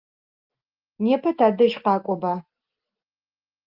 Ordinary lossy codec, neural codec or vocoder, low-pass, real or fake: Opus, 32 kbps; none; 5.4 kHz; real